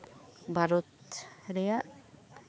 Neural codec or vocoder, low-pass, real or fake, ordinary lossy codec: codec, 16 kHz, 4 kbps, X-Codec, HuBERT features, trained on balanced general audio; none; fake; none